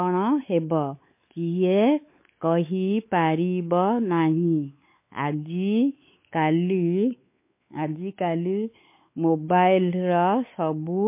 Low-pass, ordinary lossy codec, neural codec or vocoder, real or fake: 3.6 kHz; MP3, 24 kbps; codec, 16 kHz, 4 kbps, X-Codec, WavLM features, trained on Multilingual LibriSpeech; fake